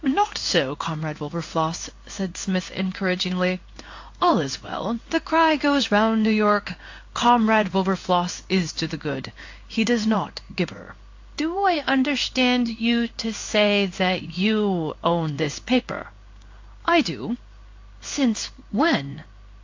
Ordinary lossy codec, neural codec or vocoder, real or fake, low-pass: AAC, 48 kbps; codec, 16 kHz in and 24 kHz out, 1 kbps, XY-Tokenizer; fake; 7.2 kHz